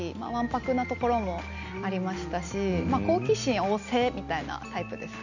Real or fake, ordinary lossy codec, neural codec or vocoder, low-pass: real; none; none; 7.2 kHz